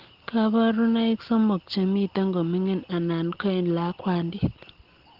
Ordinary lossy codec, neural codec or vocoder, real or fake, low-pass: Opus, 16 kbps; none; real; 5.4 kHz